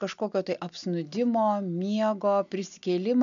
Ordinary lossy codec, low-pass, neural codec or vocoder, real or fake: MP3, 96 kbps; 7.2 kHz; none; real